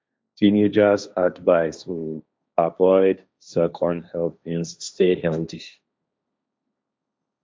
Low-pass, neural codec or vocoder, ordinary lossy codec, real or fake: none; codec, 16 kHz, 1.1 kbps, Voila-Tokenizer; none; fake